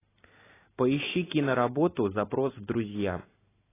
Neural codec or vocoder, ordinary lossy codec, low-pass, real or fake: none; AAC, 16 kbps; 3.6 kHz; real